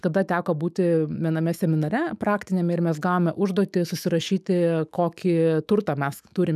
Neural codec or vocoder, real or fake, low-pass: autoencoder, 48 kHz, 128 numbers a frame, DAC-VAE, trained on Japanese speech; fake; 14.4 kHz